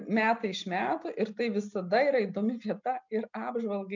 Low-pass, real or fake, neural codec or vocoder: 7.2 kHz; real; none